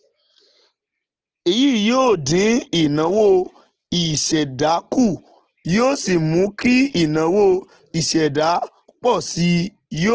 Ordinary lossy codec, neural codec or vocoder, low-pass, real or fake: Opus, 16 kbps; none; 7.2 kHz; real